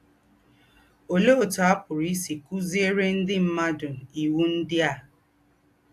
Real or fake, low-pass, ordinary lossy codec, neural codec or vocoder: real; 14.4 kHz; AAC, 64 kbps; none